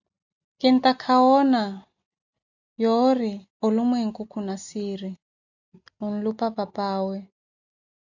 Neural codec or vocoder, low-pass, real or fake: none; 7.2 kHz; real